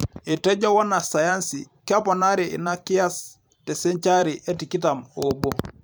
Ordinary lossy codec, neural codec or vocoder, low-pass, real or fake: none; vocoder, 44.1 kHz, 128 mel bands every 512 samples, BigVGAN v2; none; fake